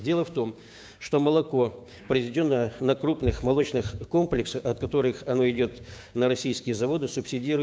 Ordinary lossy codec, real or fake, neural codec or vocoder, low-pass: none; fake; codec, 16 kHz, 6 kbps, DAC; none